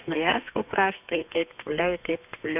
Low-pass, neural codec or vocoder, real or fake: 3.6 kHz; codec, 32 kHz, 1.9 kbps, SNAC; fake